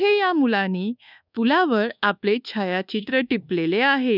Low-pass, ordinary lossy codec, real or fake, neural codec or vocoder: 5.4 kHz; none; fake; codec, 24 kHz, 1.2 kbps, DualCodec